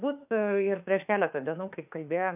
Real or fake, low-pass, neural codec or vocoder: fake; 3.6 kHz; autoencoder, 48 kHz, 32 numbers a frame, DAC-VAE, trained on Japanese speech